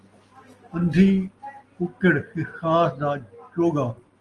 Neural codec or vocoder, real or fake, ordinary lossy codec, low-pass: none; real; Opus, 24 kbps; 10.8 kHz